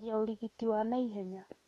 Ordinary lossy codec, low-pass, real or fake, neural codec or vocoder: AAC, 32 kbps; 19.8 kHz; fake; autoencoder, 48 kHz, 32 numbers a frame, DAC-VAE, trained on Japanese speech